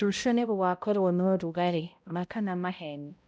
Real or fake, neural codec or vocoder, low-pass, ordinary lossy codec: fake; codec, 16 kHz, 0.5 kbps, X-Codec, HuBERT features, trained on balanced general audio; none; none